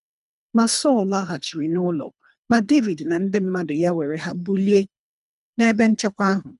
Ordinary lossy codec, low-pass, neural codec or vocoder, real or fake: none; 10.8 kHz; codec, 24 kHz, 3 kbps, HILCodec; fake